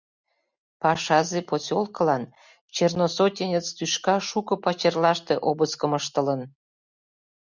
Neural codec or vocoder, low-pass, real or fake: none; 7.2 kHz; real